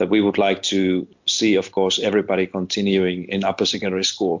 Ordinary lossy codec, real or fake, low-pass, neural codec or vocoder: MP3, 64 kbps; real; 7.2 kHz; none